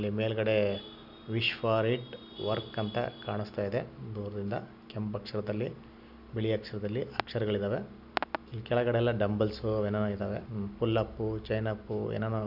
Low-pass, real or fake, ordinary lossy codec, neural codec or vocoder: 5.4 kHz; real; none; none